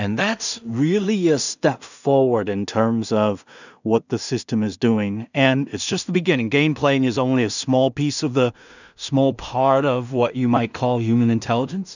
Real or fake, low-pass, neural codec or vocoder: fake; 7.2 kHz; codec, 16 kHz in and 24 kHz out, 0.4 kbps, LongCat-Audio-Codec, two codebook decoder